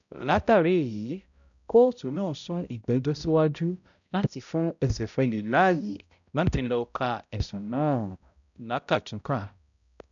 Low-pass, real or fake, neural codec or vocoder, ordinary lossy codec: 7.2 kHz; fake; codec, 16 kHz, 0.5 kbps, X-Codec, HuBERT features, trained on balanced general audio; none